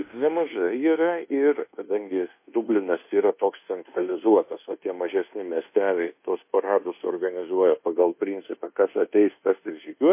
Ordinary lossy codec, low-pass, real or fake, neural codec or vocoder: MP3, 24 kbps; 3.6 kHz; fake; codec, 24 kHz, 1.2 kbps, DualCodec